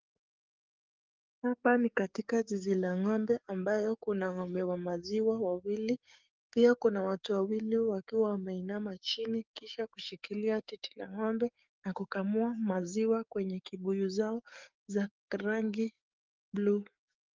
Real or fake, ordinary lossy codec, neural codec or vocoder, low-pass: fake; Opus, 32 kbps; codec, 44.1 kHz, 7.8 kbps, DAC; 7.2 kHz